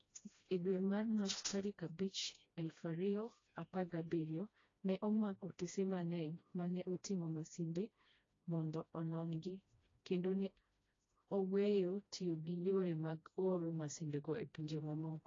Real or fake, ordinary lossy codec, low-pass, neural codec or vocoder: fake; AAC, 32 kbps; 7.2 kHz; codec, 16 kHz, 1 kbps, FreqCodec, smaller model